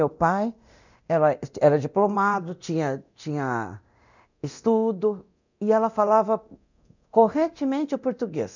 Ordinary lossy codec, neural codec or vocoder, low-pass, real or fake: none; codec, 24 kHz, 0.9 kbps, DualCodec; 7.2 kHz; fake